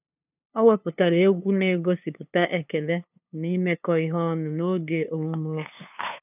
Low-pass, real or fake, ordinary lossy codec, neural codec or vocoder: 3.6 kHz; fake; none; codec, 16 kHz, 2 kbps, FunCodec, trained on LibriTTS, 25 frames a second